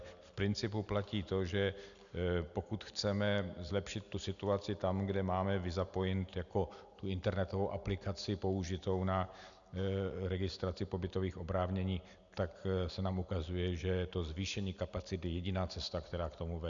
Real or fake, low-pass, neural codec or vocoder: real; 7.2 kHz; none